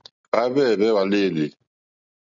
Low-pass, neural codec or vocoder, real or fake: 7.2 kHz; none; real